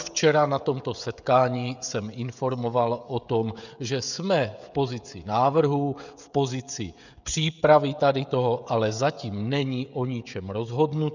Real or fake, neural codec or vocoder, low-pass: fake; codec, 16 kHz, 16 kbps, FreqCodec, smaller model; 7.2 kHz